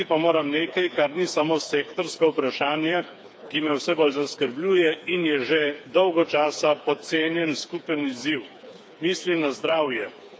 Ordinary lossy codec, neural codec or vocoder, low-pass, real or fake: none; codec, 16 kHz, 4 kbps, FreqCodec, smaller model; none; fake